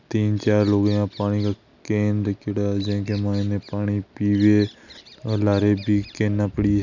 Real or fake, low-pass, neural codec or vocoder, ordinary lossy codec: real; 7.2 kHz; none; none